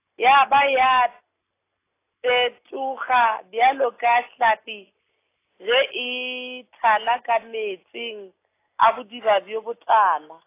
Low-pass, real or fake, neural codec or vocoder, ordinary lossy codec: 3.6 kHz; real; none; AAC, 24 kbps